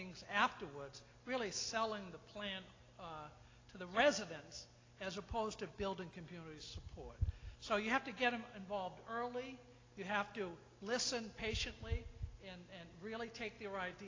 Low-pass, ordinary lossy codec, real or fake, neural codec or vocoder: 7.2 kHz; AAC, 32 kbps; real; none